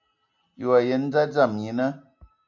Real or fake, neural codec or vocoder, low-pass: real; none; 7.2 kHz